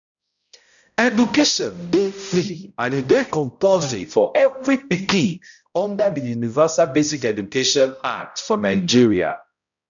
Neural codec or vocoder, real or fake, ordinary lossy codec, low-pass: codec, 16 kHz, 0.5 kbps, X-Codec, HuBERT features, trained on balanced general audio; fake; none; 7.2 kHz